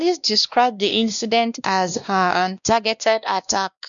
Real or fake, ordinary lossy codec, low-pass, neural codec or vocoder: fake; none; 7.2 kHz; codec, 16 kHz, 1 kbps, X-Codec, WavLM features, trained on Multilingual LibriSpeech